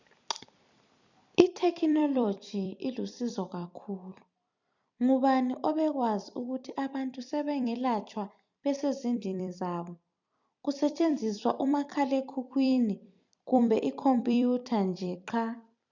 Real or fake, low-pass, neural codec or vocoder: fake; 7.2 kHz; vocoder, 44.1 kHz, 128 mel bands every 256 samples, BigVGAN v2